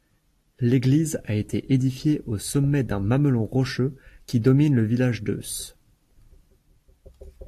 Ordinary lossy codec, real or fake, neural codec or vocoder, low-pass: AAC, 64 kbps; real; none; 14.4 kHz